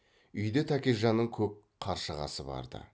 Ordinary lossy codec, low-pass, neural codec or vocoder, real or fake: none; none; none; real